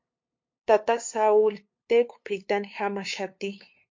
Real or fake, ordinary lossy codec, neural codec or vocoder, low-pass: fake; MP3, 48 kbps; codec, 16 kHz, 2 kbps, FunCodec, trained on LibriTTS, 25 frames a second; 7.2 kHz